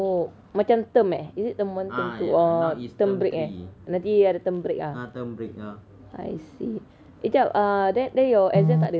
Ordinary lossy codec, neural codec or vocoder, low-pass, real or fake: none; none; none; real